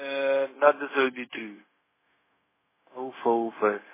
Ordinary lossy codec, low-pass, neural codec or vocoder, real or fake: MP3, 16 kbps; 3.6 kHz; codec, 16 kHz, 0.4 kbps, LongCat-Audio-Codec; fake